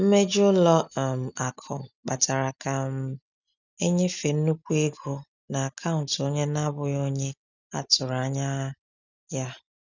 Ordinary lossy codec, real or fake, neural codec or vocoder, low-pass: none; real; none; 7.2 kHz